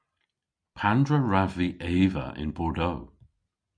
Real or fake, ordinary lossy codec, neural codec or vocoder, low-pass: real; MP3, 96 kbps; none; 9.9 kHz